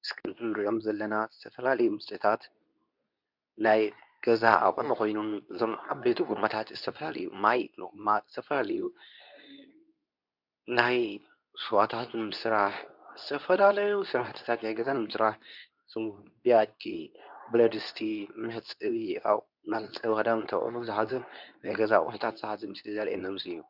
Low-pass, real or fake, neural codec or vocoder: 5.4 kHz; fake; codec, 24 kHz, 0.9 kbps, WavTokenizer, medium speech release version 2